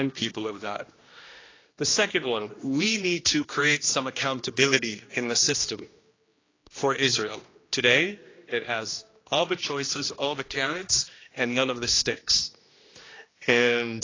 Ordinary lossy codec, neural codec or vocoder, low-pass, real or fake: AAC, 32 kbps; codec, 16 kHz, 1 kbps, X-Codec, HuBERT features, trained on general audio; 7.2 kHz; fake